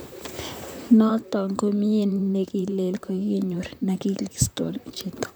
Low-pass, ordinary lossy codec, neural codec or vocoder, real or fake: none; none; vocoder, 44.1 kHz, 128 mel bands, Pupu-Vocoder; fake